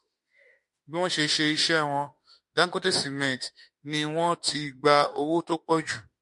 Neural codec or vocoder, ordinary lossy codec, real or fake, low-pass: autoencoder, 48 kHz, 32 numbers a frame, DAC-VAE, trained on Japanese speech; MP3, 48 kbps; fake; 14.4 kHz